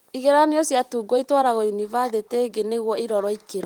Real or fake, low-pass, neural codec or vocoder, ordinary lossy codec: fake; 19.8 kHz; vocoder, 44.1 kHz, 128 mel bands, Pupu-Vocoder; Opus, 24 kbps